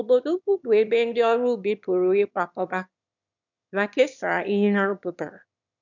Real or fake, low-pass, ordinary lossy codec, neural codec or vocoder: fake; 7.2 kHz; none; autoencoder, 22.05 kHz, a latent of 192 numbers a frame, VITS, trained on one speaker